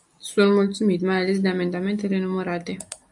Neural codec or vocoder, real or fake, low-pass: none; real; 10.8 kHz